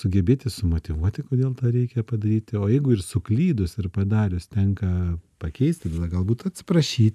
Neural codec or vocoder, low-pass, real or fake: none; 14.4 kHz; real